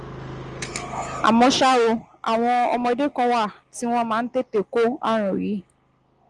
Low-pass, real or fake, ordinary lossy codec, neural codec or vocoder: 10.8 kHz; real; Opus, 24 kbps; none